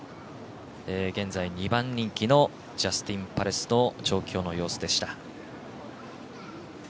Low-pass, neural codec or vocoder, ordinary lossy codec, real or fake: none; none; none; real